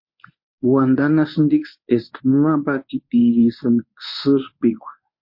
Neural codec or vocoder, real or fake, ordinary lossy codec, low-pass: codec, 24 kHz, 0.9 kbps, WavTokenizer, medium speech release version 1; fake; MP3, 32 kbps; 5.4 kHz